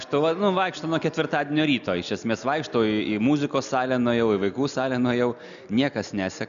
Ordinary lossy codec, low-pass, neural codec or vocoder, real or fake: MP3, 96 kbps; 7.2 kHz; none; real